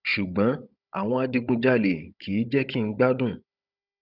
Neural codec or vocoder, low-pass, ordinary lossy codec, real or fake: codec, 16 kHz, 16 kbps, FunCodec, trained on Chinese and English, 50 frames a second; 5.4 kHz; none; fake